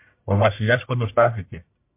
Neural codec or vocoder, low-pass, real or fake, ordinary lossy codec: codec, 44.1 kHz, 1.7 kbps, Pupu-Codec; 3.6 kHz; fake; MP3, 32 kbps